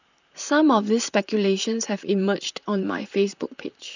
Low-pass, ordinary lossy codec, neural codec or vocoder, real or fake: 7.2 kHz; none; vocoder, 44.1 kHz, 128 mel bands, Pupu-Vocoder; fake